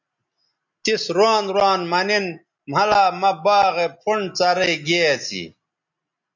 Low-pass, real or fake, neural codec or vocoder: 7.2 kHz; real; none